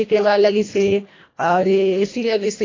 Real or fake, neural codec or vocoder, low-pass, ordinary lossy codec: fake; codec, 24 kHz, 1.5 kbps, HILCodec; 7.2 kHz; AAC, 32 kbps